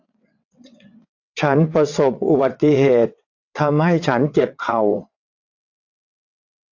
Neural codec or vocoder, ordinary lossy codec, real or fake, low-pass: vocoder, 22.05 kHz, 80 mel bands, WaveNeXt; AAC, 48 kbps; fake; 7.2 kHz